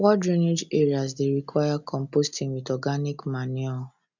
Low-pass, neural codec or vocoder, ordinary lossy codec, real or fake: 7.2 kHz; none; none; real